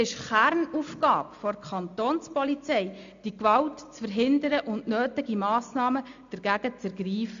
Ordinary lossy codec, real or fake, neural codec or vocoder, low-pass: AAC, 64 kbps; real; none; 7.2 kHz